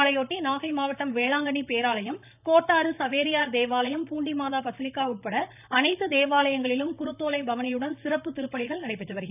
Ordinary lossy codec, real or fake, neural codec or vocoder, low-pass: none; fake; vocoder, 44.1 kHz, 128 mel bands, Pupu-Vocoder; 3.6 kHz